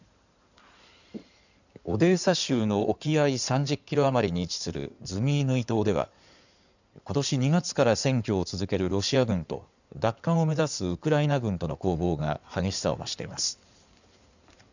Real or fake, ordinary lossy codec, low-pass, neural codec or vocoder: fake; none; 7.2 kHz; codec, 16 kHz in and 24 kHz out, 2.2 kbps, FireRedTTS-2 codec